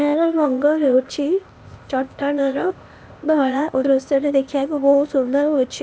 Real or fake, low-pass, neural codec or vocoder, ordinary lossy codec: fake; none; codec, 16 kHz, 0.8 kbps, ZipCodec; none